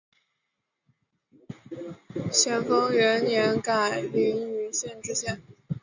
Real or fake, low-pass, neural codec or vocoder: real; 7.2 kHz; none